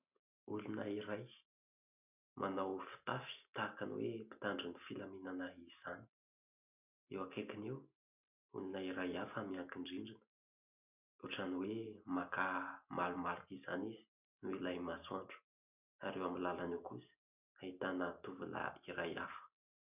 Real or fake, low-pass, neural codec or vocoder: real; 3.6 kHz; none